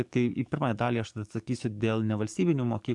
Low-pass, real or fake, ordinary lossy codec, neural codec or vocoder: 10.8 kHz; fake; AAC, 64 kbps; codec, 44.1 kHz, 7.8 kbps, DAC